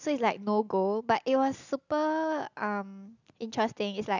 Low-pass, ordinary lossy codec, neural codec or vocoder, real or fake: 7.2 kHz; none; none; real